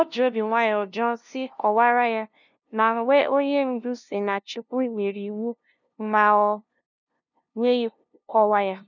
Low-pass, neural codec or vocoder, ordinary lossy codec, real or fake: 7.2 kHz; codec, 16 kHz, 0.5 kbps, FunCodec, trained on LibriTTS, 25 frames a second; none; fake